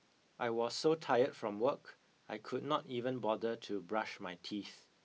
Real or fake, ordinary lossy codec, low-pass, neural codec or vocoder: real; none; none; none